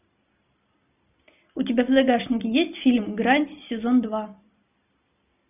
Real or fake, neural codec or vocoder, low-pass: real; none; 3.6 kHz